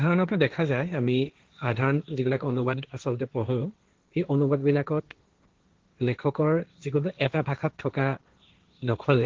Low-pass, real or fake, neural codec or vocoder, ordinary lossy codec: 7.2 kHz; fake; codec, 16 kHz, 1.1 kbps, Voila-Tokenizer; Opus, 16 kbps